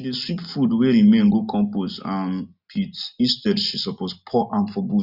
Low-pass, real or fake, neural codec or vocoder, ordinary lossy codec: 5.4 kHz; real; none; none